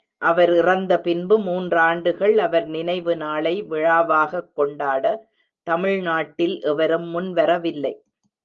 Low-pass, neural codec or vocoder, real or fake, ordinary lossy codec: 7.2 kHz; none; real; Opus, 32 kbps